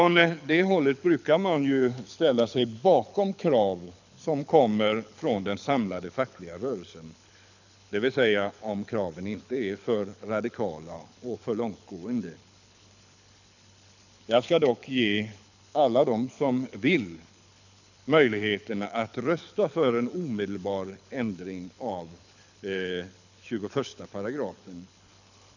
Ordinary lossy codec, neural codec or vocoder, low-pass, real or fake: none; codec, 24 kHz, 6 kbps, HILCodec; 7.2 kHz; fake